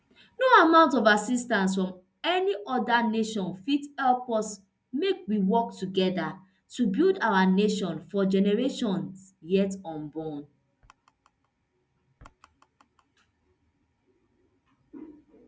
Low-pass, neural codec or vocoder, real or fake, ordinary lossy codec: none; none; real; none